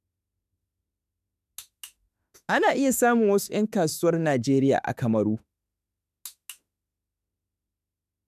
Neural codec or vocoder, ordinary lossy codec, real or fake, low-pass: autoencoder, 48 kHz, 32 numbers a frame, DAC-VAE, trained on Japanese speech; none; fake; 14.4 kHz